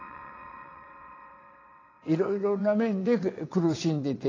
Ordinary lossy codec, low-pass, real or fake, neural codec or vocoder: AAC, 32 kbps; 7.2 kHz; fake; vocoder, 22.05 kHz, 80 mel bands, WaveNeXt